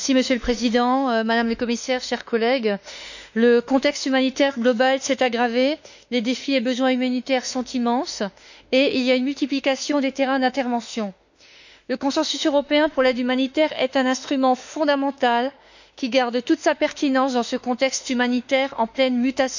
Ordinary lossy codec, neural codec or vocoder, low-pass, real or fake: none; autoencoder, 48 kHz, 32 numbers a frame, DAC-VAE, trained on Japanese speech; 7.2 kHz; fake